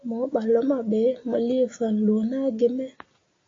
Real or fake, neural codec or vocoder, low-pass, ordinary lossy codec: real; none; 7.2 kHz; AAC, 32 kbps